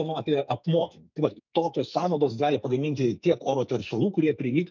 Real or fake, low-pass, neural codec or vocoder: fake; 7.2 kHz; codec, 44.1 kHz, 2.6 kbps, SNAC